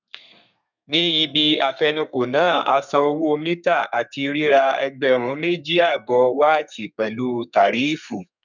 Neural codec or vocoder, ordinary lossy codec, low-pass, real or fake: codec, 32 kHz, 1.9 kbps, SNAC; none; 7.2 kHz; fake